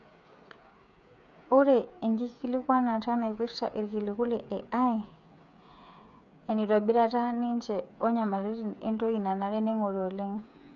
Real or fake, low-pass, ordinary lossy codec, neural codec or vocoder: fake; 7.2 kHz; none; codec, 16 kHz, 8 kbps, FreqCodec, smaller model